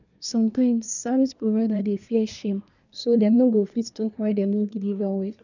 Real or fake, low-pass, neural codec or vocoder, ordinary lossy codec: fake; 7.2 kHz; codec, 24 kHz, 1 kbps, SNAC; none